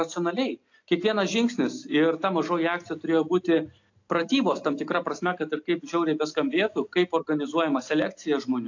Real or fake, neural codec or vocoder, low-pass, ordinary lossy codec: real; none; 7.2 kHz; AAC, 48 kbps